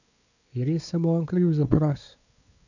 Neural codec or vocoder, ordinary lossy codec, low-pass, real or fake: codec, 16 kHz, 4 kbps, X-Codec, WavLM features, trained on Multilingual LibriSpeech; none; 7.2 kHz; fake